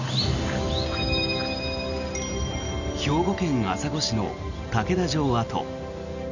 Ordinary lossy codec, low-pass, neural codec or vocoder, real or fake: none; 7.2 kHz; none; real